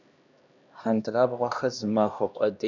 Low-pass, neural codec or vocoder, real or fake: 7.2 kHz; codec, 16 kHz, 1 kbps, X-Codec, HuBERT features, trained on LibriSpeech; fake